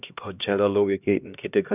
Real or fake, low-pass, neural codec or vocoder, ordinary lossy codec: fake; 3.6 kHz; codec, 16 kHz, 1 kbps, X-Codec, HuBERT features, trained on LibriSpeech; none